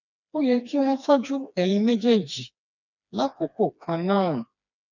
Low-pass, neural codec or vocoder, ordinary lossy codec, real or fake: 7.2 kHz; codec, 16 kHz, 2 kbps, FreqCodec, smaller model; none; fake